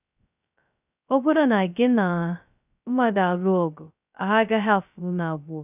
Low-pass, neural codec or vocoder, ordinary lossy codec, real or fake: 3.6 kHz; codec, 16 kHz, 0.2 kbps, FocalCodec; none; fake